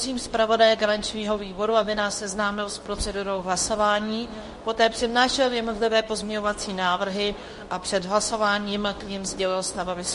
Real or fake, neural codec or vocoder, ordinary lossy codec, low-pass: fake; codec, 24 kHz, 0.9 kbps, WavTokenizer, medium speech release version 2; MP3, 48 kbps; 10.8 kHz